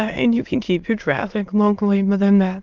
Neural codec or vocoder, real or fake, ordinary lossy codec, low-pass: autoencoder, 22.05 kHz, a latent of 192 numbers a frame, VITS, trained on many speakers; fake; Opus, 32 kbps; 7.2 kHz